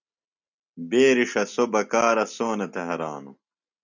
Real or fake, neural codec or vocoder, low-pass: real; none; 7.2 kHz